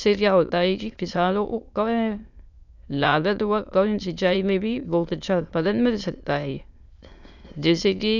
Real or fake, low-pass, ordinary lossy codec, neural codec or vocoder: fake; 7.2 kHz; none; autoencoder, 22.05 kHz, a latent of 192 numbers a frame, VITS, trained on many speakers